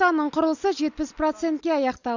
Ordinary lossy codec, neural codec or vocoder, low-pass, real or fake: none; none; 7.2 kHz; real